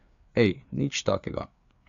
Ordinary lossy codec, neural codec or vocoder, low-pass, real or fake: AAC, 96 kbps; codec, 16 kHz, 4 kbps, FreqCodec, larger model; 7.2 kHz; fake